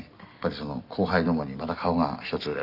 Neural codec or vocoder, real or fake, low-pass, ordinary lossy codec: none; real; 5.4 kHz; none